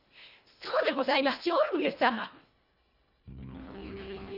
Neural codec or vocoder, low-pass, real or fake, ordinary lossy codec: codec, 24 kHz, 1.5 kbps, HILCodec; 5.4 kHz; fake; none